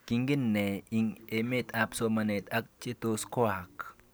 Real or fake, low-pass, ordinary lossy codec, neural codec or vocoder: real; none; none; none